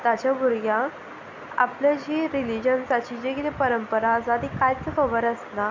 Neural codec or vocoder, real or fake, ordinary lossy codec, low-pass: none; real; MP3, 64 kbps; 7.2 kHz